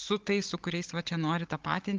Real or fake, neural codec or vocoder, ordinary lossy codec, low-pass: fake; codec, 16 kHz, 16 kbps, FunCodec, trained on Chinese and English, 50 frames a second; Opus, 16 kbps; 7.2 kHz